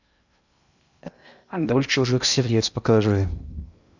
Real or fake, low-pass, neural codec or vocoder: fake; 7.2 kHz; codec, 16 kHz in and 24 kHz out, 0.6 kbps, FocalCodec, streaming, 2048 codes